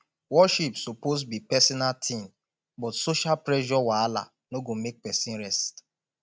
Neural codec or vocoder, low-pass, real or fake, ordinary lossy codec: none; none; real; none